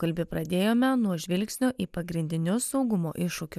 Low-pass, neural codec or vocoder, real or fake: 14.4 kHz; none; real